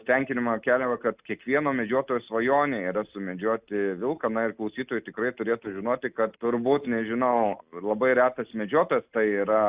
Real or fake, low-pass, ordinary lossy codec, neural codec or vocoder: real; 3.6 kHz; Opus, 64 kbps; none